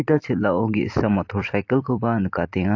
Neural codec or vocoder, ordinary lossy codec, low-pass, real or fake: vocoder, 44.1 kHz, 128 mel bands every 256 samples, BigVGAN v2; AAC, 48 kbps; 7.2 kHz; fake